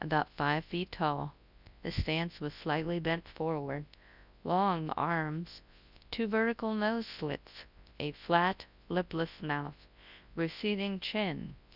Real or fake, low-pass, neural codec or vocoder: fake; 5.4 kHz; codec, 24 kHz, 0.9 kbps, WavTokenizer, large speech release